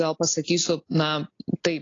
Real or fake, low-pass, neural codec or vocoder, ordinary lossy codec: real; 7.2 kHz; none; AAC, 32 kbps